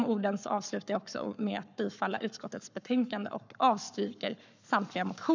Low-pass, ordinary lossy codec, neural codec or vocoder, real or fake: 7.2 kHz; none; codec, 44.1 kHz, 7.8 kbps, Pupu-Codec; fake